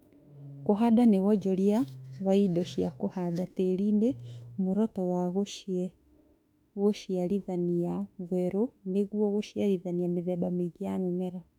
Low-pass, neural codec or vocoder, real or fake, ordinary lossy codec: 19.8 kHz; autoencoder, 48 kHz, 32 numbers a frame, DAC-VAE, trained on Japanese speech; fake; none